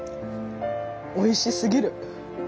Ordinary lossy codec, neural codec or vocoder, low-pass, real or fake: none; none; none; real